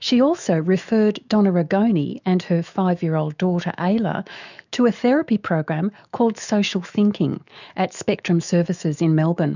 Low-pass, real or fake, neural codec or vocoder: 7.2 kHz; fake; autoencoder, 48 kHz, 128 numbers a frame, DAC-VAE, trained on Japanese speech